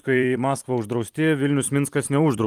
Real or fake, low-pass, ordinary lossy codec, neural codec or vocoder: fake; 14.4 kHz; Opus, 32 kbps; vocoder, 44.1 kHz, 128 mel bands every 256 samples, BigVGAN v2